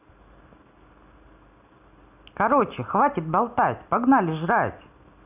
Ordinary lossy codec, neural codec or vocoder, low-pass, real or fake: none; none; 3.6 kHz; real